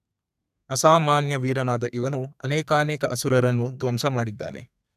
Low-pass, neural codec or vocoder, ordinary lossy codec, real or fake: 14.4 kHz; codec, 32 kHz, 1.9 kbps, SNAC; none; fake